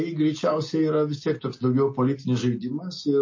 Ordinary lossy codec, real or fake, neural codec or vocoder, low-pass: MP3, 32 kbps; real; none; 7.2 kHz